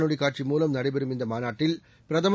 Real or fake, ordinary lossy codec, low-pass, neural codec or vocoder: real; none; none; none